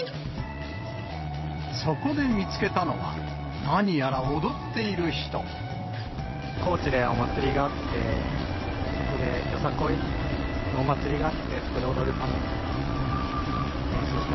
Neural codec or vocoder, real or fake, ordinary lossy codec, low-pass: vocoder, 22.05 kHz, 80 mel bands, WaveNeXt; fake; MP3, 24 kbps; 7.2 kHz